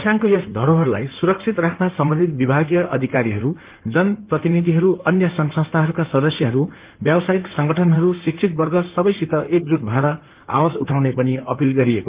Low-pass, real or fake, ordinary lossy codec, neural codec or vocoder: 3.6 kHz; fake; Opus, 32 kbps; codec, 16 kHz in and 24 kHz out, 2.2 kbps, FireRedTTS-2 codec